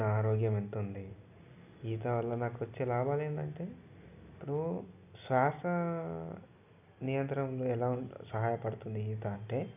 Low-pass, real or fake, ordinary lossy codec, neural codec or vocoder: 3.6 kHz; real; none; none